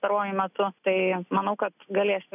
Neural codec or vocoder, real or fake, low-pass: none; real; 3.6 kHz